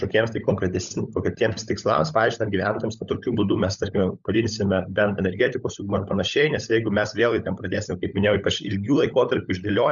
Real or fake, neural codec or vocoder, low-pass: fake; codec, 16 kHz, 16 kbps, FunCodec, trained on LibriTTS, 50 frames a second; 7.2 kHz